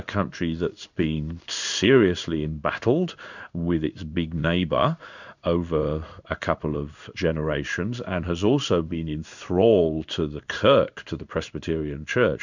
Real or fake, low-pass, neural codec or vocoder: fake; 7.2 kHz; codec, 16 kHz in and 24 kHz out, 1 kbps, XY-Tokenizer